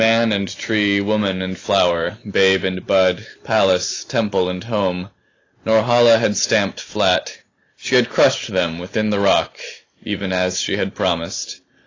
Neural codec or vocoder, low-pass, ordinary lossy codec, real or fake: none; 7.2 kHz; AAC, 32 kbps; real